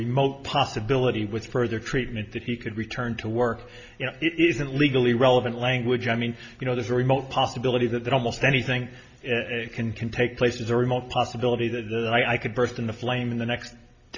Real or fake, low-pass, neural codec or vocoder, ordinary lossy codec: real; 7.2 kHz; none; MP3, 48 kbps